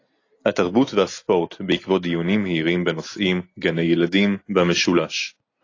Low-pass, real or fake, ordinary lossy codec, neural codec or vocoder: 7.2 kHz; real; AAC, 32 kbps; none